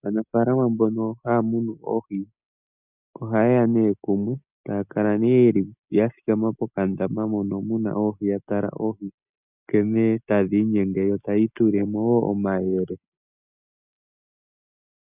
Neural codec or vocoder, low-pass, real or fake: none; 3.6 kHz; real